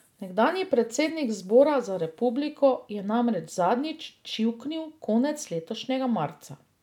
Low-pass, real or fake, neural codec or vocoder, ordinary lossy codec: 19.8 kHz; real; none; none